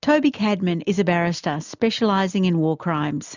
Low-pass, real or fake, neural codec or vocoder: 7.2 kHz; real; none